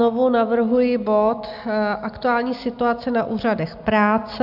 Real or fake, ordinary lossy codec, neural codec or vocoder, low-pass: real; AAC, 48 kbps; none; 5.4 kHz